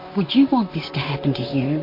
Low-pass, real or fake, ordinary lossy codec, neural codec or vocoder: 5.4 kHz; fake; MP3, 32 kbps; vocoder, 44.1 kHz, 128 mel bands, Pupu-Vocoder